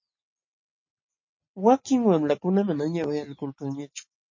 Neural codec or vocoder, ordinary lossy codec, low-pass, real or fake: none; MP3, 32 kbps; 7.2 kHz; real